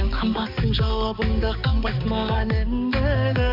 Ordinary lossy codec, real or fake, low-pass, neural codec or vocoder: MP3, 48 kbps; fake; 5.4 kHz; codec, 16 kHz, 4 kbps, X-Codec, HuBERT features, trained on balanced general audio